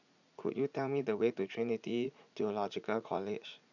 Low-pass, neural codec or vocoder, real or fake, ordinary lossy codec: 7.2 kHz; vocoder, 44.1 kHz, 80 mel bands, Vocos; fake; none